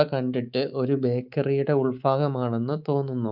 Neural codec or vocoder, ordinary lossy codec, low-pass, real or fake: codec, 24 kHz, 3.1 kbps, DualCodec; Opus, 24 kbps; 5.4 kHz; fake